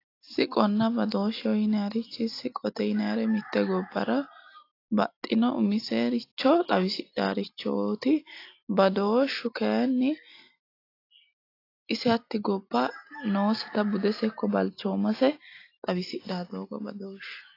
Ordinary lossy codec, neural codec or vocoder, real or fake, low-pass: AAC, 32 kbps; none; real; 5.4 kHz